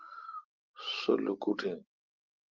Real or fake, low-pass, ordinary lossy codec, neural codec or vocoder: real; 7.2 kHz; Opus, 24 kbps; none